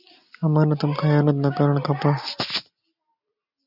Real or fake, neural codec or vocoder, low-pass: real; none; 5.4 kHz